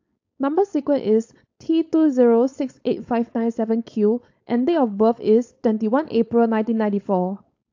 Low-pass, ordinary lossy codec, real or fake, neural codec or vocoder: 7.2 kHz; AAC, 48 kbps; fake; codec, 16 kHz, 4.8 kbps, FACodec